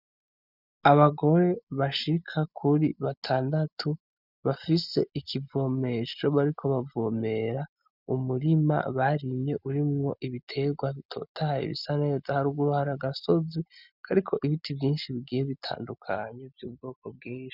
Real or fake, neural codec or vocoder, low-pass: real; none; 5.4 kHz